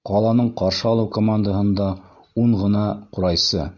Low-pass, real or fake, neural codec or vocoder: 7.2 kHz; real; none